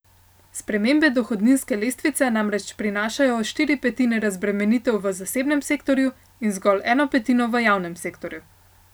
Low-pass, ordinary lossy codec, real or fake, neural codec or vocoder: none; none; real; none